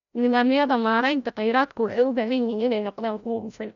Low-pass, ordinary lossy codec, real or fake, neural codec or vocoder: 7.2 kHz; none; fake; codec, 16 kHz, 0.5 kbps, FreqCodec, larger model